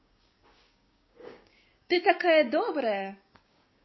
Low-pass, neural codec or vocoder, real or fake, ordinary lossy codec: 7.2 kHz; codec, 16 kHz, 6 kbps, DAC; fake; MP3, 24 kbps